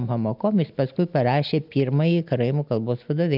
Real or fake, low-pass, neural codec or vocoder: real; 5.4 kHz; none